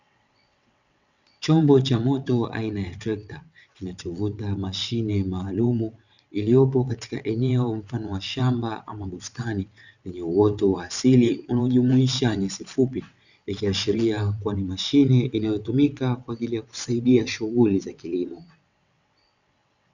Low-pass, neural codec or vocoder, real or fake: 7.2 kHz; vocoder, 22.05 kHz, 80 mel bands, WaveNeXt; fake